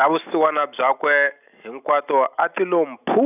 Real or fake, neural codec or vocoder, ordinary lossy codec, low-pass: real; none; none; 3.6 kHz